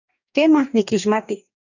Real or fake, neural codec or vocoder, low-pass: fake; codec, 44.1 kHz, 2.6 kbps, DAC; 7.2 kHz